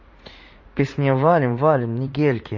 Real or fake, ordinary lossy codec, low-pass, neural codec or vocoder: fake; MP3, 32 kbps; 7.2 kHz; vocoder, 44.1 kHz, 80 mel bands, Vocos